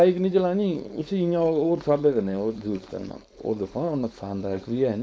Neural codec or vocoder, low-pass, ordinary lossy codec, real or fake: codec, 16 kHz, 4.8 kbps, FACodec; none; none; fake